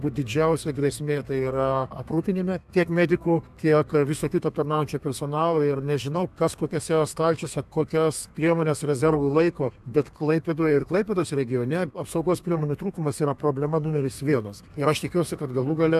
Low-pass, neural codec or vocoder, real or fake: 14.4 kHz; codec, 32 kHz, 1.9 kbps, SNAC; fake